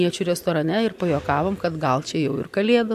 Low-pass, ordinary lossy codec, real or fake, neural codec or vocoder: 14.4 kHz; AAC, 96 kbps; real; none